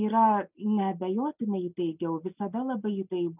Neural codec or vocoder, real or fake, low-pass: none; real; 3.6 kHz